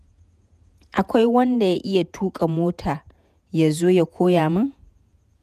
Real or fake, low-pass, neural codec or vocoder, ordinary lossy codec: fake; 14.4 kHz; vocoder, 48 kHz, 128 mel bands, Vocos; none